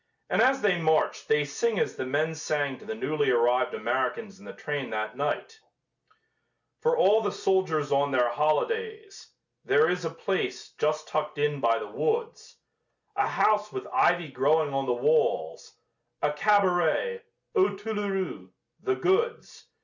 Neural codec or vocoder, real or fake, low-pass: none; real; 7.2 kHz